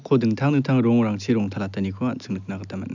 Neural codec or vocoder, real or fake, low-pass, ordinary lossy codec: none; real; 7.2 kHz; none